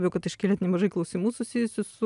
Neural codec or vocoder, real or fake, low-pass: none; real; 10.8 kHz